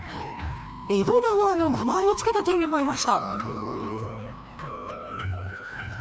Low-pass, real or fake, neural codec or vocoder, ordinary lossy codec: none; fake; codec, 16 kHz, 1 kbps, FreqCodec, larger model; none